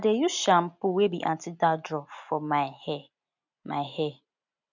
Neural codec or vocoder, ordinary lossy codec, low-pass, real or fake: none; none; 7.2 kHz; real